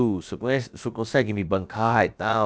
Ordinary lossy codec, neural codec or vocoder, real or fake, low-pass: none; codec, 16 kHz, about 1 kbps, DyCAST, with the encoder's durations; fake; none